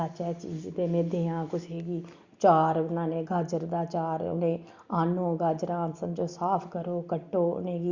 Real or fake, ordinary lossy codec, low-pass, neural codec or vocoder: real; Opus, 64 kbps; 7.2 kHz; none